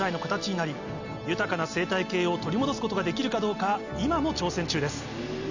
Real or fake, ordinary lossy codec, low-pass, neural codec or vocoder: real; none; 7.2 kHz; none